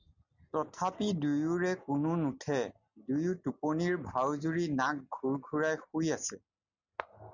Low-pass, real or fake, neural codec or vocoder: 7.2 kHz; real; none